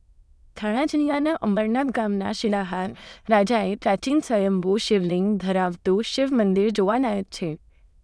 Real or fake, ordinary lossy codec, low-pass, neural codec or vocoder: fake; none; none; autoencoder, 22.05 kHz, a latent of 192 numbers a frame, VITS, trained on many speakers